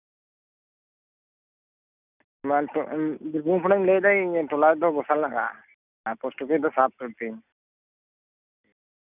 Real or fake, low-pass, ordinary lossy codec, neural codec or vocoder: real; 3.6 kHz; none; none